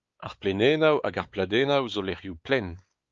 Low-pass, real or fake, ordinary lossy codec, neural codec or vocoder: 7.2 kHz; fake; Opus, 32 kbps; codec, 16 kHz, 4 kbps, X-Codec, WavLM features, trained on Multilingual LibriSpeech